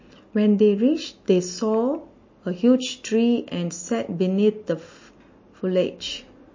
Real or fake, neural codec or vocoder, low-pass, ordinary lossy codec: real; none; 7.2 kHz; MP3, 32 kbps